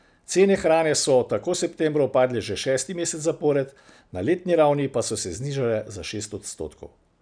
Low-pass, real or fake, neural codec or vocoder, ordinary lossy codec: 9.9 kHz; real; none; none